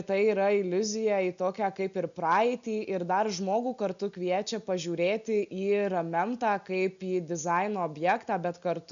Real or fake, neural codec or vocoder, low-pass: real; none; 7.2 kHz